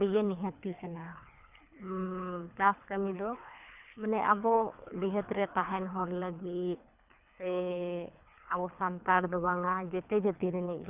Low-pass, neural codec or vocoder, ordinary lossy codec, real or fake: 3.6 kHz; codec, 24 kHz, 3 kbps, HILCodec; none; fake